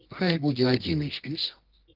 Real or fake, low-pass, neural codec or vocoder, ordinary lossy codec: fake; 5.4 kHz; codec, 24 kHz, 0.9 kbps, WavTokenizer, medium music audio release; Opus, 16 kbps